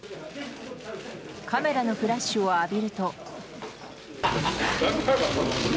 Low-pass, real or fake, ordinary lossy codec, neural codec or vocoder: none; real; none; none